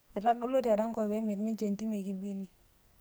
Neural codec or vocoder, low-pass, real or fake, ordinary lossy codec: codec, 44.1 kHz, 2.6 kbps, SNAC; none; fake; none